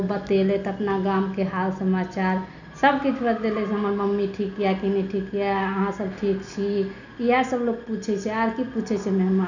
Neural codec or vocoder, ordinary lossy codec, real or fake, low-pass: none; none; real; 7.2 kHz